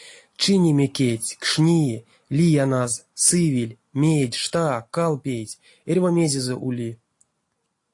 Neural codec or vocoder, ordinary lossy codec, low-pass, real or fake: none; AAC, 48 kbps; 10.8 kHz; real